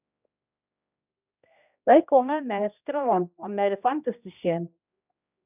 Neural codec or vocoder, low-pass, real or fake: codec, 16 kHz, 1 kbps, X-Codec, HuBERT features, trained on general audio; 3.6 kHz; fake